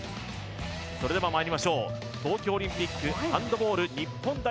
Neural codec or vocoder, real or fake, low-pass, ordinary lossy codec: none; real; none; none